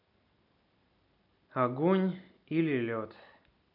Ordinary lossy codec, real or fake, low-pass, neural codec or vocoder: none; real; 5.4 kHz; none